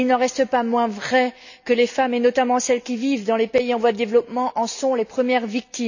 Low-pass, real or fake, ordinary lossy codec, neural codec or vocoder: 7.2 kHz; real; none; none